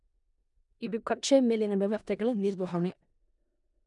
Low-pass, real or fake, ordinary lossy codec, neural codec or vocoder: 10.8 kHz; fake; none; codec, 16 kHz in and 24 kHz out, 0.4 kbps, LongCat-Audio-Codec, four codebook decoder